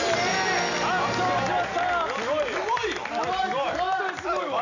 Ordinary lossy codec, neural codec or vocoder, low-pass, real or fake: none; none; 7.2 kHz; real